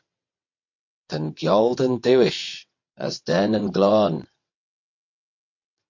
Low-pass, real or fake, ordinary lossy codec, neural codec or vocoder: 7.2 kHz; fake; MP3, 48 kbps; vocoder, 22.05 kHz, 80 mel bands, WaveNeXt